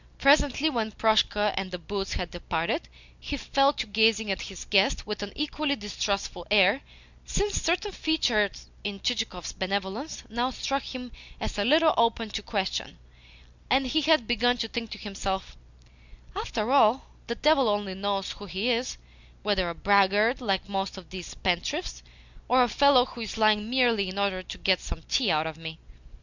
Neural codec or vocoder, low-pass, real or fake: none; 7.2 kHz; real